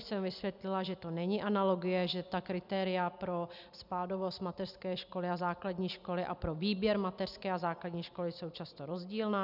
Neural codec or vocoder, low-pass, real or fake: none; 5.4 kHz; real